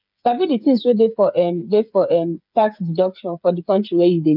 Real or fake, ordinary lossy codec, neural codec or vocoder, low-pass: fake; none; codec, 16 kHz, 8 kbps, FreqCodec, smaller model; 5.4 kHz